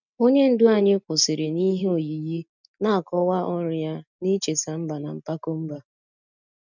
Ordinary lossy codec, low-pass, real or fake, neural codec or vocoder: none; 7.2 kHz; real; none